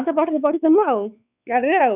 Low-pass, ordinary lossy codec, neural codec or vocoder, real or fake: 3.6 kHz; none; codec, 16 kHz, 4 kbps, X-Codec, WavLM features, trained on Multilingual LibriSpeech; fake